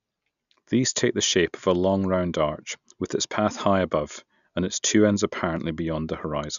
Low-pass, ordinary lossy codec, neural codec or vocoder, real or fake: 7.2 kHz; none; none; real